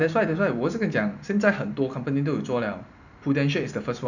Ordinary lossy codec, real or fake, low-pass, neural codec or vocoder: none; real; 7.2 kHz; none